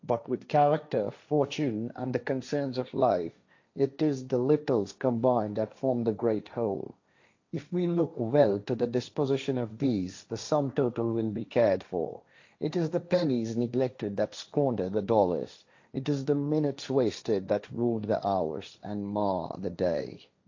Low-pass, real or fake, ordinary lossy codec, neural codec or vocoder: 7.2 kHz; fake; AAC, 48 kbps; codec, 16 kHz, 1.1 kbps, Voila-Tokenizer